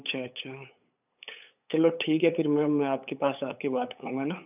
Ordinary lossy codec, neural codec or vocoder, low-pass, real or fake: none; codec, 16 kHz, 8 kbps, FunCodec, trained on LibriTTS, 25 frames a second; 3.6 kHz; fake